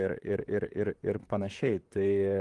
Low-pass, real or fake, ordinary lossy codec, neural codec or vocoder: 10.8 kHz; fake; Opus, 24 kbps; vocoder, 44.1 kHz, 128 mel bands, Pupu-Vocoder